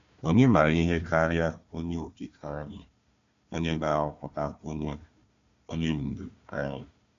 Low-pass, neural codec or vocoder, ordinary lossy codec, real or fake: 7.2 kHz; codec, 16 kHz, 1 kbps, FunCodec, trained on Chinese and English, 50 frames a second; MP3, 64 kbps; fake